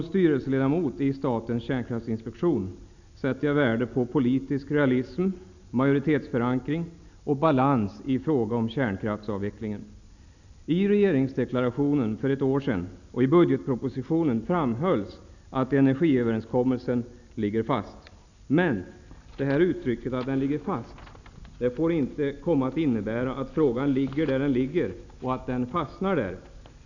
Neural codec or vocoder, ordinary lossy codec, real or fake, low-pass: none; none; real; 7.2 kHz